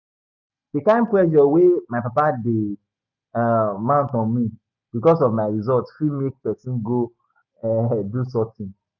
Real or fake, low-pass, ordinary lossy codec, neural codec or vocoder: real; 7.2 kHz; none; none